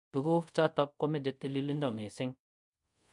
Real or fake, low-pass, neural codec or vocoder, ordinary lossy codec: fake; 10.8 kHz; codec, 24 kHz, 0.5 kbps, DualCodec; MP3, 64 kbps